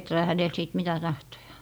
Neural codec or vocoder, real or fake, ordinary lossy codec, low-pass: none; real; none; none